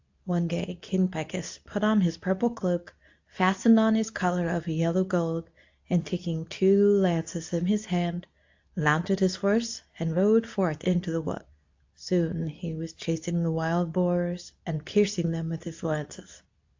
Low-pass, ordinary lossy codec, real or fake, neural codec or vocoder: 7.2 kHz; AAC, 48 kbps; fake; codec, 24 kHz, 0.9 kbps, WavTokenizer, medium speech release version 2